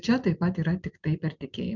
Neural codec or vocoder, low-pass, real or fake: none; 7.2 kHz; real